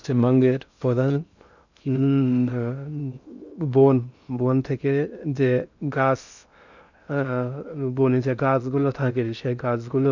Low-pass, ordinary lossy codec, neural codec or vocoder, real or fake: 7.2 kHz; none; codec, 16 kHz in and 24 kHz out, 0.6 kbps, FocalCodec, streaming, 2048 codes; fake